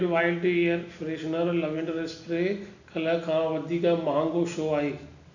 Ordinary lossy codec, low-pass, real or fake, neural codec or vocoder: none; 7.2 kHz; real; none